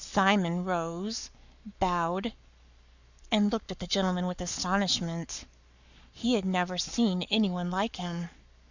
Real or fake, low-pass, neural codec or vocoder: fake; 7.2 kHz; codec, 44.1 kHz, 7.8 kbps, Pupu-Codec